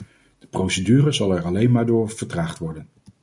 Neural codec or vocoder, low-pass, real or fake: none; 10.8 kHz; real